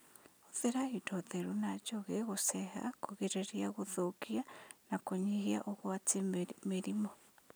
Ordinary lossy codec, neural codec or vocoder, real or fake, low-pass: none; none; real; none